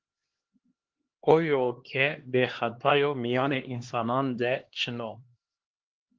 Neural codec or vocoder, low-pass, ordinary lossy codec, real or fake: codec, 16 kHz, 2 kbps, X-Codec, HuBERT features, trained on LibriSpeech; 7.2 kHz; Opus, 16 kbps; fake